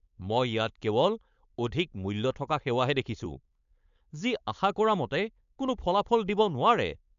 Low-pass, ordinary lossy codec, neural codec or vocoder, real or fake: 7.2 kHz; none; codec, 16 kHz, 4.8 kbps, FACodec; fake